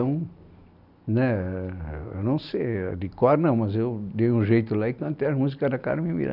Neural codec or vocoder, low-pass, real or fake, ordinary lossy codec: none; 5.4 kHz; real; none